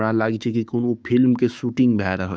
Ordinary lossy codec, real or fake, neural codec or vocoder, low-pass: none; fake; codec, 16 kHz, 6 kbps, DAC; none